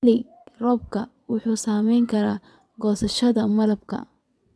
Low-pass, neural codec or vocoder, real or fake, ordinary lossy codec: none; vocoder, 22.05 kHz, 80 mel bands, WaveNeXt; fake; none